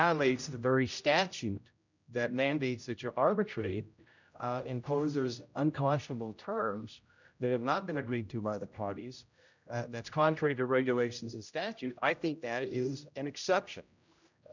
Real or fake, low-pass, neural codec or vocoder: fake; 7.2 kHz; codec, 16 kHz, 0.5 kbps, X-Codec, HuBERT features, trained on general audio